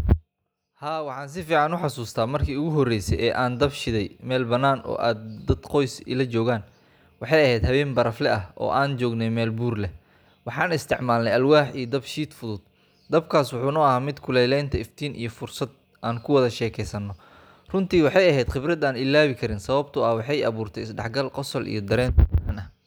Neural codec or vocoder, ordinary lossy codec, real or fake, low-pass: none; none; real; none